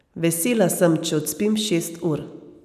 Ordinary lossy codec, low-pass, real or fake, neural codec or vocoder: none; 14.4 kHz; real; none